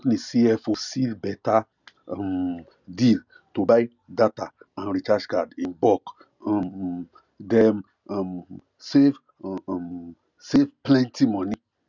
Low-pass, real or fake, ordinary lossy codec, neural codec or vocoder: 7.2 kHz; real; none; none